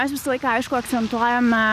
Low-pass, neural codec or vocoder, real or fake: 14.4 kHz; none; real